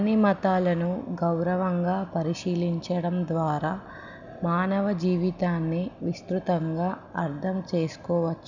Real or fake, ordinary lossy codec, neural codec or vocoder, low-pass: real; none; none; 7.2 kHz